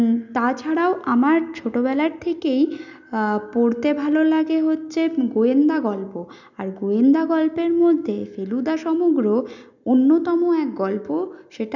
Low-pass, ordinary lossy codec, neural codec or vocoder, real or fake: 7.2 kHz; none; none; real